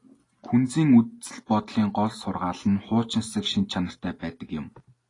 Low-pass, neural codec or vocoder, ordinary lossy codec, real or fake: 10.8 kHz; none; AAC, 32 kbps; real